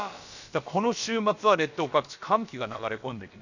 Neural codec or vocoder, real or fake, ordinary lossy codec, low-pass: codec, 16 kHz, about 1 kbps, DyCAST, with the encoder's durations; fake; none; 7.2 kHz